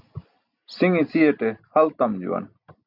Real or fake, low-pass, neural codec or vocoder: real; 5.4 kHz; none